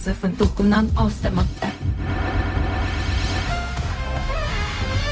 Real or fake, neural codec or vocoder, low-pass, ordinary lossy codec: fake; codec, 16 kHz, 0.4 kbps, LongCat-Audio-Codec; none; none